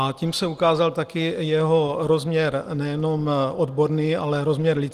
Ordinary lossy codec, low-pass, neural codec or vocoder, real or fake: Opus, 24 kbps; 14.4 kHz; none; real